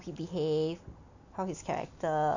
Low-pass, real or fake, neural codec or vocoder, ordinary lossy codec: 7.2 kHz; real; none; none